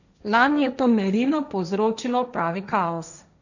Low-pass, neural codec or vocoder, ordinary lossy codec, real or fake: 7.2 kHz; codec, 16 kHz, 1.1 kbps, Voila-Tokenizer; none; fake